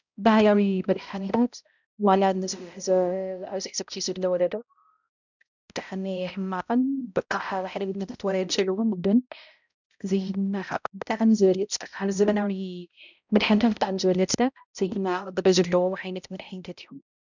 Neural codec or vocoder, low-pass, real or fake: codec, 16 kHz, 0.5 kbps, X-Codec, HuBERT features, trained on balanced general audio; 7.2 kHz; fake